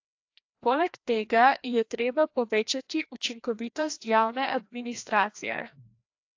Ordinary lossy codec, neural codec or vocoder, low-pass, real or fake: AAC, 48 kbps; codec, 16 kHz, 1 kbps, FreqCodec, larger model; 7.2 kHz; fake